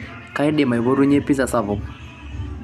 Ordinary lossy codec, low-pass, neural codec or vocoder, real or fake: none; 14.4 kHz; none; real